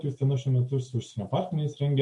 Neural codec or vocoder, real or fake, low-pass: none; real; 10.8 kHz